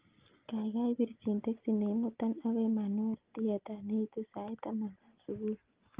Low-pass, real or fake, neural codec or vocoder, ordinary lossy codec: 3.6 kHz; real; none; Opus, 32 kbps